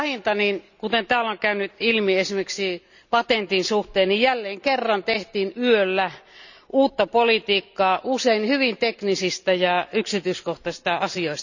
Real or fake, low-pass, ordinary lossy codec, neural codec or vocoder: real; 7.2 kHz; none; none